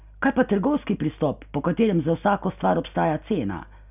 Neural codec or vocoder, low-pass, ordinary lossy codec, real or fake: none; 3.6 kHz; none; real